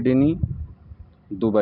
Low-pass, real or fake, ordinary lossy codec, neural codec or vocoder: 5.4 kHz; real; none; none